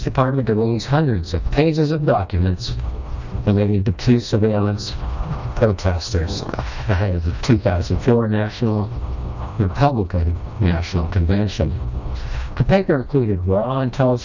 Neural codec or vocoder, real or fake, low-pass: codec, 16 kHz, 1 kbps, FreqCodec, smaller model; fake; 7.2 kHz